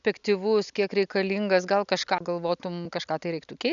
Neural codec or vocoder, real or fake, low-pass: none; real; 7.2 kHz